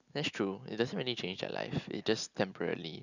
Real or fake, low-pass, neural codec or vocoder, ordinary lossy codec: real; 7.2 kHz; none; none